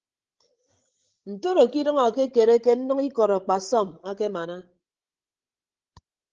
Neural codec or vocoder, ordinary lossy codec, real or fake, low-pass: codec, 16 kHz, 16 kbps, FreqCodec, larger model; Opus, 16 kbps; fake; 7.2 kHz